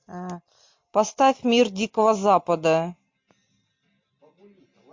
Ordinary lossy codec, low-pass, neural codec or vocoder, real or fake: MP3, 48 kbps; 7.2 kHz; none; real